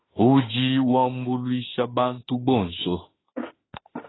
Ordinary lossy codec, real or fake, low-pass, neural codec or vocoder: AAC, 16 kbps; fake; 7.2 kHz; autoencoder, 48 kHz, 32 numbers a frame, DAC-VAE, trained on Japanese speech